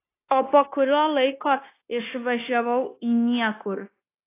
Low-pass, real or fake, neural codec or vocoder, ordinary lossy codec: 3.6 kHz; fake; codec, 16 kHz, 0.9 kbps, LongCat-Audio-Codec; AAC, 24 kbps